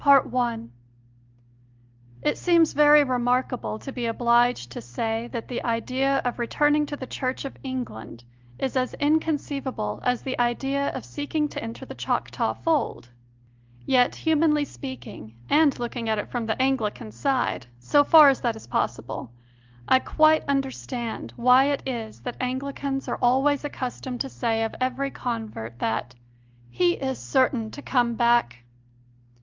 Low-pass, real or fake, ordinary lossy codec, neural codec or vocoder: 7.2 kHz; real; Opus, 24 kbps; none